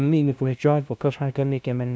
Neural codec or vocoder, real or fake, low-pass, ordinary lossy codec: codec, 16 kHz, 0.5 kbps, FunCodec, trained on LibriTTS, 25 frames a second; fake; none; none